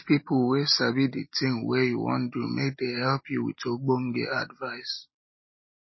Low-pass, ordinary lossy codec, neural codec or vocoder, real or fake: 7.2 kHz; MP3, 24 kbps; none; real